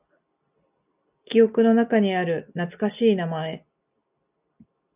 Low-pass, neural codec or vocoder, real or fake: 3.6 kHz; none; real